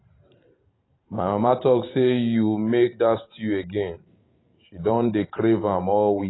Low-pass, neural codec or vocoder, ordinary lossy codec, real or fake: 7.2 kHz; vocoder, 44.1 kHz, 128 mel bands every 512 samples, BigVGAN v2; AAC, 16 kbps; fake